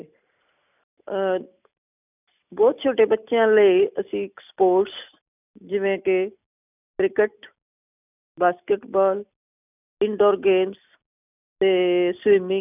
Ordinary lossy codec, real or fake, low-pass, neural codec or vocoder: none; real; 3.6 kHz; none